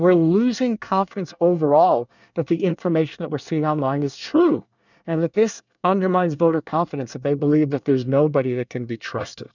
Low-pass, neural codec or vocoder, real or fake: 7.2 kHz; codec, 24 kHz, 1 kbps, SNAC; fake